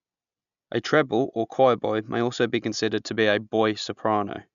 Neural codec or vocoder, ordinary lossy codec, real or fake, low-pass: none; MP3, 96 kbps; real; 7.2 kHz